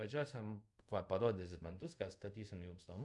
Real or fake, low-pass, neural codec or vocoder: fake; 10.8 kHz; codec, 24 kHz, 0.5 kbps, DualCodec